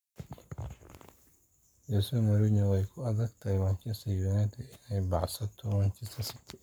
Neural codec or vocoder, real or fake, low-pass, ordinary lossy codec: codec, 44.1 kHz, 7.8 kbps, Pupu-Codec; fake; none; none